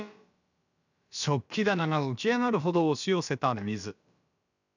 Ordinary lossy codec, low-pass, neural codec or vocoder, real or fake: none; 7.2 kHz; codec, 16 kHz, about 1 kbps, DyCAST, with the encoder's durations; fake